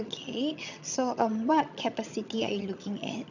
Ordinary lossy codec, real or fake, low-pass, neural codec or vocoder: none; fake; 7.2 kHz; vocoder, 22.05 kHz, 80 mel bands, HiFi-GAN